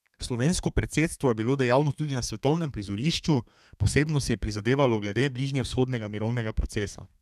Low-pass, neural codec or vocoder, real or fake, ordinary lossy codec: 14.4 kHz; codec, 32 kHz, 1.9 kbps, SNAC; fake; none